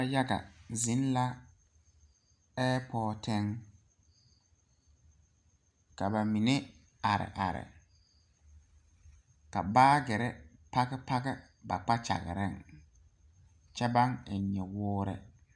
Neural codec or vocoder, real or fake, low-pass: none; real; 9.9 kHz